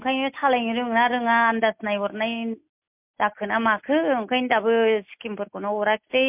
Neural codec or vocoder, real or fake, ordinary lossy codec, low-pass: none; real; MP3, 32 kbps; 3.6 kHz